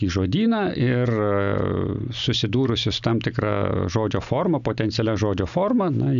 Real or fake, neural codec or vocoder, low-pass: real; none; 7.2 kHz